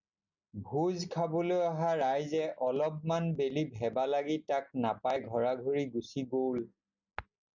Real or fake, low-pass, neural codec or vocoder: real; 7.2 kHz; none